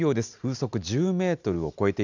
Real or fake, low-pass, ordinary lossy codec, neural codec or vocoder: real; 7.2 kHz; none; none